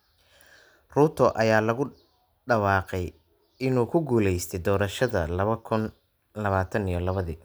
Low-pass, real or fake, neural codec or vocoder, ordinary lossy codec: none; real; none; none